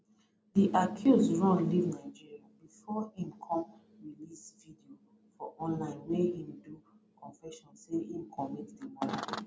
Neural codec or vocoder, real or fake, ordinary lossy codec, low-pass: none; real; none; none